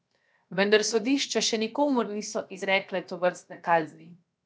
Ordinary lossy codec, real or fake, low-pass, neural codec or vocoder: none; fake; none; codec, 16 kHz, 0.7 kbps, FocalCodec